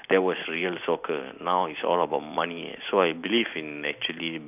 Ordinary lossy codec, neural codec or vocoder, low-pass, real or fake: none; none; 3.6 kHz; real